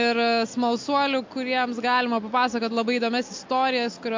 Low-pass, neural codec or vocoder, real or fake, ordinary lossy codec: 7.2 kHz; none; real; MP3, 48 kbps